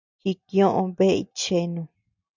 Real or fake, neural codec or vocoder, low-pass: real; none; 7.2 kHz